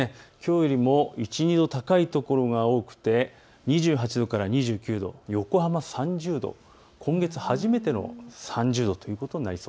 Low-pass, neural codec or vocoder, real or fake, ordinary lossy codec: none; none; real; none